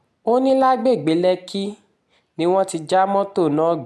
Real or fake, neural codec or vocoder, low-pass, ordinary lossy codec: real; none; none; none